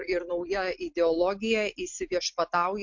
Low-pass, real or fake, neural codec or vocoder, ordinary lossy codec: 7.2 kHz; real; none; MP3, 48 kbps